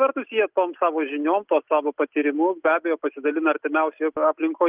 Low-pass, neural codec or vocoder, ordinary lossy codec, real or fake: 3.6 kHz; none; Opus, 32 kbps; real